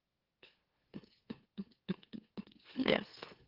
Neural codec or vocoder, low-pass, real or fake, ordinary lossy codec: autoencoder, 44.1 kHz, a latent of 192 numbers a frame, MeloTTS; 5.4 kHz; fake; Opus, 16 kbps